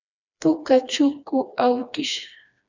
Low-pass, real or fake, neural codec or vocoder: 7.2 kHz; fake; codec, 16 kHz, 2 kbps, FreqCodec, smaller model